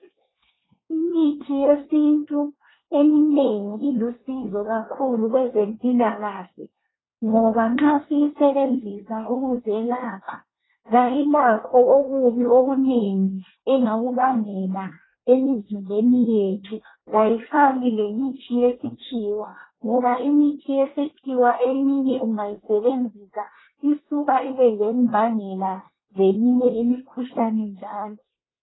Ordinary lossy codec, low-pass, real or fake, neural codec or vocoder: AAC, 16 kbps; 7.2 kHz; fake; codec, 24 kHz, 1 kbps, SNAC